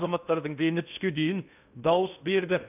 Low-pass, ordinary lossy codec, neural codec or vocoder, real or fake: 3.6 kHz; none; codec, 16 kHz in and 24 kHz out, 0.6 kbps, FocalCodec, streaming, 2048 codes; fake